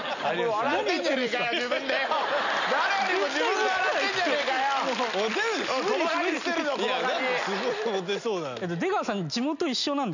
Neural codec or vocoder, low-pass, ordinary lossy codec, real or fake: none; 7.2 kHz; none; real